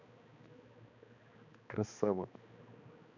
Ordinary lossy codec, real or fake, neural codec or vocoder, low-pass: none; fake; codec, 16 kHz, 2 kbps, X-Codec, HuBERT features, trained on balanced general audio; 7.2 kHz